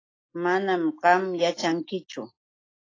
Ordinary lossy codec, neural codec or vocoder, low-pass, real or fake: AAC, 32 kbps; none; 7.2 kHz; real